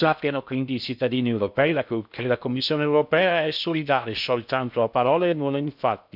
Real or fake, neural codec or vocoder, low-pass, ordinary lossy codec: fake; codec, 16 kHz in and 24 kHz out, 0.6 kbps, FocalCodec, streaming, 2048 codes; 5.4 kHz; none